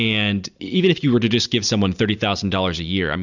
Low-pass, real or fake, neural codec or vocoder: 7.2 kHz; real; none